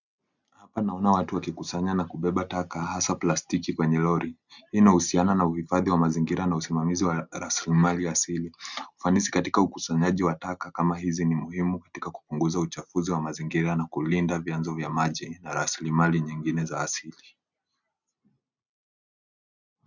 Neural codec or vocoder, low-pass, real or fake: none; 7.2 kHz; real